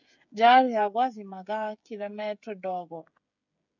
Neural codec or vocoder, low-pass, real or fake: codec, 16 kHz, 16 kbps, FreqCodec, smaller model; 7.2 kHz; fake